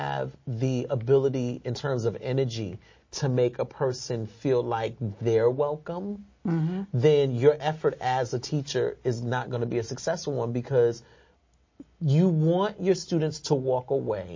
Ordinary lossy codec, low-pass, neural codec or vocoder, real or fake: MP3, 32 kbps; 7.2 kHz; none; real